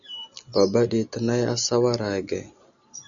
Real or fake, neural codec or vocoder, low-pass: real; none; 7.2 kHz